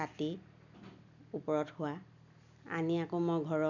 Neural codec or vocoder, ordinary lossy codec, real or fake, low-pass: none; none; real; 7.2 kHz